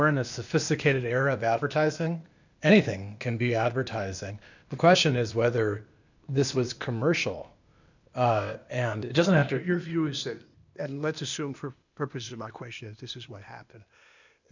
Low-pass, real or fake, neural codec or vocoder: 7.2 kHz; fake; codec, 16 kHz, 0.8 kbps, ZipCodec